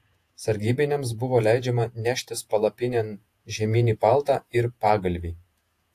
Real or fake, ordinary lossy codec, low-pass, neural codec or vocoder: fake; AAC, 64 kbps; 14.4 kHz; vocoder, 48 kHz, 128 mel bands, Vocos